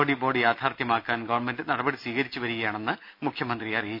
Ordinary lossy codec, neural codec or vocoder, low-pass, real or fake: none; none; 5.4 kHz; real